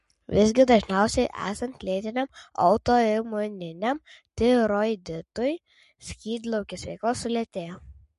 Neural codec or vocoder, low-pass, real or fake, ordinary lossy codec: codec, 44.1 kHz, 7.8 kbps, Pupu-Codec; 14.4 kHz; fake; MP3, 48 kbps